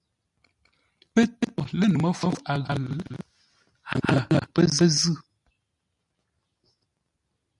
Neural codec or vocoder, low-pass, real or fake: none; 10.8 kHz; real